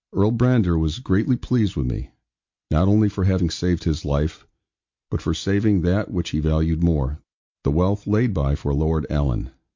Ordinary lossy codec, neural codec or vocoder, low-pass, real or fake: MP3, 48 kbps; none; 7.2 kHz; real